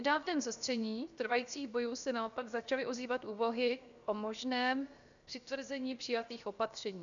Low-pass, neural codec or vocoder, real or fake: 7.2 kHz; codec, 16 kHz, 0.7 kbps, FocalCodec; fake